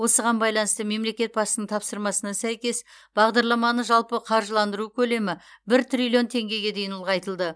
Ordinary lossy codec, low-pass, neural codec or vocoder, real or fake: none; none; none; real